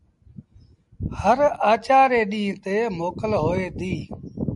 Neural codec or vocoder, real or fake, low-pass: none; real; 10.8 kHz